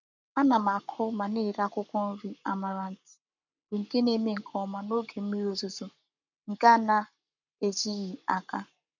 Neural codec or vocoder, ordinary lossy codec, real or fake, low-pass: codec, 44.1 kHz, 7.8 kbps, Pupu-Codec; none; fake; 7.2 kHz